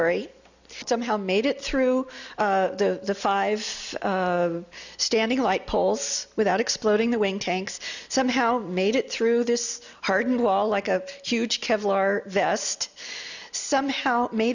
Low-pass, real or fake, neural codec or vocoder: 7.2 kHz; real; none